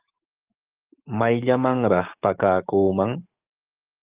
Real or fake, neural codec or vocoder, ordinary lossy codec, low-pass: fake; codec, 44.1 kHz, 7.8 kbps, DAC; Opus, 24 kbps; 3.6 kHz